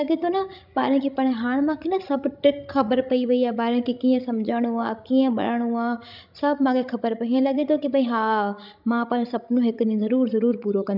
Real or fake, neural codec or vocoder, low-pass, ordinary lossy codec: fake; codec, 16 kHz, 16 kbps, FreqCodec, larger model; 5.4 kHz; none